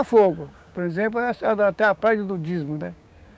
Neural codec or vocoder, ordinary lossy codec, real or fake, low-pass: codec, 16 kHz, 6 kbps, DAC; none; fake; none